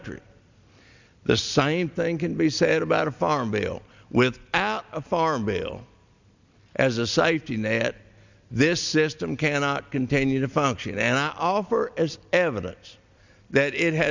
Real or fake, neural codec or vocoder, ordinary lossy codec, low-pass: real; none; Opus, 64 kbps; 7.2 kHz